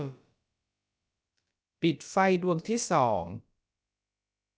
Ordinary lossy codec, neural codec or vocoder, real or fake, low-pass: none; codec, 16 kHz, about 1 kbps, DyCAST, with the encoder's durations; fake; none